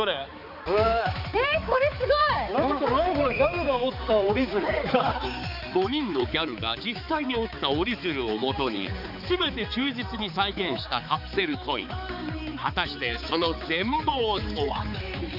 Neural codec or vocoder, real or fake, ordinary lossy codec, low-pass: codec, 16 kHz, 4 kbps, X-Codec, HuBERT features, trained on balanced general audio; fake; none; 5.4 kHz